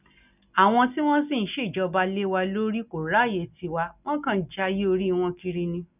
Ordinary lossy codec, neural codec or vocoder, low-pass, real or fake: none; none; 3.6 kHz; real